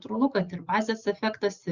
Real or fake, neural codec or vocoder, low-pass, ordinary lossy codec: real; none; 7.2 kHz; Opus, 64 kbps